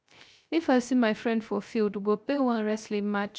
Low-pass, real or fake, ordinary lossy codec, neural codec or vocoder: none; fake; none; codec, 16 kHz, 0.3 kbps, FocalCodec